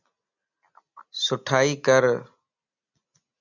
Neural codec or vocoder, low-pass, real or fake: none; 7.2 kHz; real